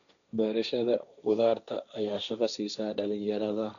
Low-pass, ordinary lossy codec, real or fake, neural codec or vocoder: 7.2 kHz; none; fake; codec, 16 kHz, 1.1 kbps, Voila-Tokenizer